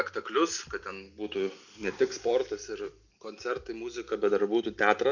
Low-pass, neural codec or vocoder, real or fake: 7.2 kHz; none; real